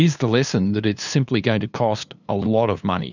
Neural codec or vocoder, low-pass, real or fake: codec, 16 kHz, 2 kbps, FunCodec, trained on LibriTTS, 25 frames a second; 7.2 kHz; fake